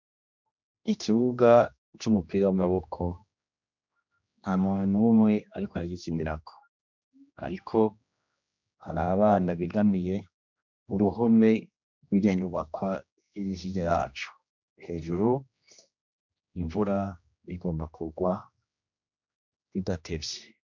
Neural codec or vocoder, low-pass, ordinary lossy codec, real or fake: codec, 16 kHz, 1 kbps, X-Codec, HuBERT features, trained on general audio; 7.2 kHz; MP3, 64 kbps; fake